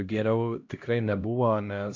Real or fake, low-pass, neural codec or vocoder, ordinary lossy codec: fake; 7.2 kHz; codec, 16 kHz, 1 kbps, X-Codec, HuBERT features, trained on LibriSpeech; AAC, 48 kbps